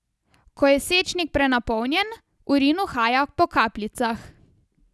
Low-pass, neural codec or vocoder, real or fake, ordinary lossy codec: none; none; real; none